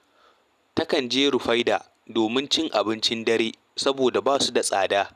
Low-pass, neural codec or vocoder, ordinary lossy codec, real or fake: 14.4 kHz; none; none; real